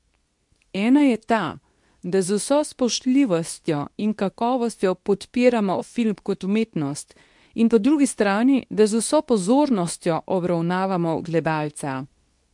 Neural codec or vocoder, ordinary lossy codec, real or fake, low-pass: codec, 24 kHz, 0.9 kbps, WavTokenizer, medium speech release version 2; MP3, 64 kbps; fake; 10.8 kHz